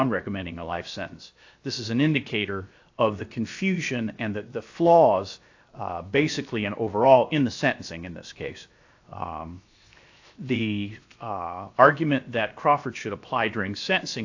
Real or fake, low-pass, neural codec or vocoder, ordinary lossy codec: fake; 7.2 kHz; codec, 16 kHz, 0.7 kbps, FocalCodec; AAC, 48 kbps